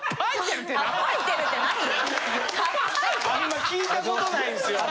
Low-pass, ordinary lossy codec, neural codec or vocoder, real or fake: none; none; none; real